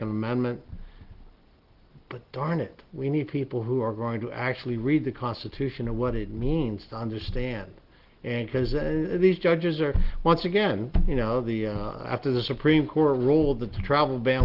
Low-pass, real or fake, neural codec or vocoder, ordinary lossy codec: 5.4 kHz; real; none; Opus, 16 kbps